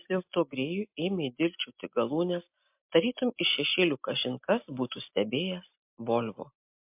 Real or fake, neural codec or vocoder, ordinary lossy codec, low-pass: real; none; MP3, 32 kbps; 3.6 kHz